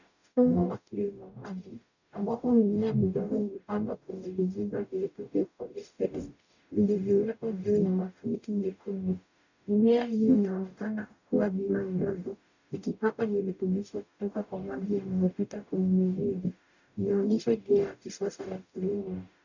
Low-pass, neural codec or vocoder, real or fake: 7.2 kHz; codec, 44.1 kHz, 0.9 kbps, DAC; fake